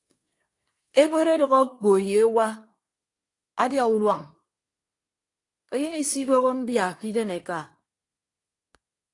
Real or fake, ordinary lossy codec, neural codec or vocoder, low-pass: fake; AAC, 48 kbps; codec, 24 kHz, 1 kbps, SNAC; 10.8 kHz